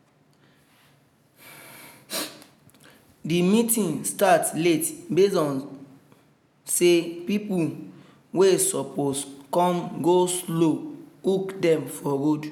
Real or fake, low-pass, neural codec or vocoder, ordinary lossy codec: real; 19.8 kHz; none; none